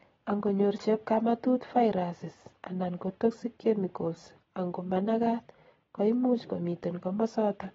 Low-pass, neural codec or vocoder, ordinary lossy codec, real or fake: 7.2 kHz; none; AAC, 24 kbps; real